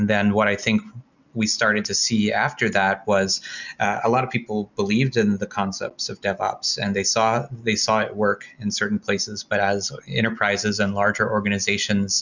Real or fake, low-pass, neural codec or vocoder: real; 7.2 kHz; none